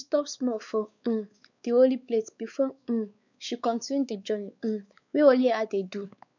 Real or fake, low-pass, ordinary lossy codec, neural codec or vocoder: fake; 7.2 kHz; none; codec, 16 kHz, 4 kbps, X-Codec, WavLM features, trained on Multilingual LibriSpeech